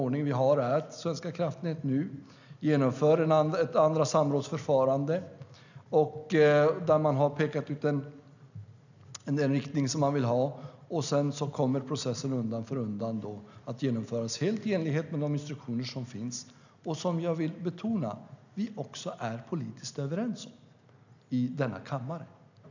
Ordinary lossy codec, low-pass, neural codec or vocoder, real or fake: none; 7.2 kHz; none; real